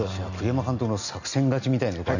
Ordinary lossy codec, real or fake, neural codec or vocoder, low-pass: none; real; none; 7.2 kHz